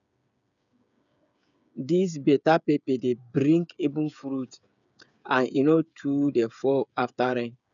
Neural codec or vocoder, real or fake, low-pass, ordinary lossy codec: codec, 16 kHz, 8 kbps, FreqCodec, smaller model; fake; 7.2 kHz; none